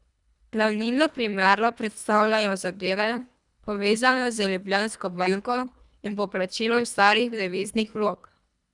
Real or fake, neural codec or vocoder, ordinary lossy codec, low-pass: fake; codec, 24 kHz, 1.5 kbps, HILCodec; none; 10.8 kHz